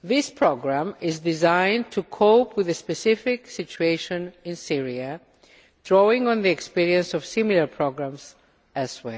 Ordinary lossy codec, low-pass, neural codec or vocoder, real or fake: none; none; none; real